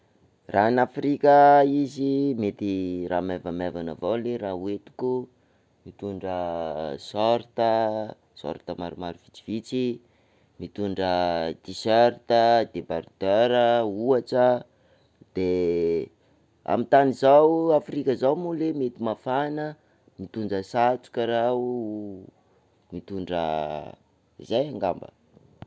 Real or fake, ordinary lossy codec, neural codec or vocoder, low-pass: real; none; none; none